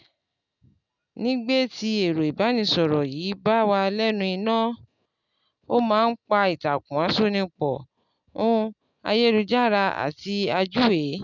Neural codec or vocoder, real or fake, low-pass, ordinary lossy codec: none; real; 7.2 kHz; none